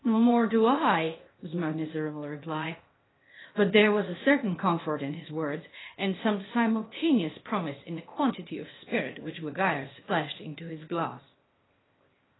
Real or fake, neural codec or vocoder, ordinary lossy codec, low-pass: fake; codec, 16 kHz, 0.8 kbps, ZipCodec; AAC, 16 kbps; 7.2 kHz